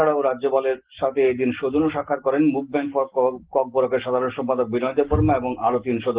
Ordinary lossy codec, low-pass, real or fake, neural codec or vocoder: Opus, 16 kbps; 3.6 kHz; real; none